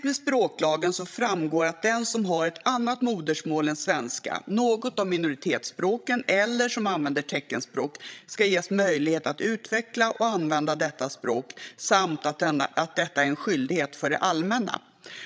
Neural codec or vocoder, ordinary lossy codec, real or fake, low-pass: codec, 16 kHz, 16 kbps, FreqCodec, larger model; none; fake; none